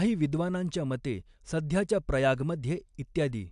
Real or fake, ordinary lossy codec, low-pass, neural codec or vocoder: real; AAC, 96 kbps; 10.8 kHz; none